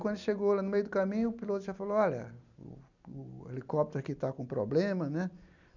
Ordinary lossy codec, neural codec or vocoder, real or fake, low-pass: none; none; real; 7.2 kHz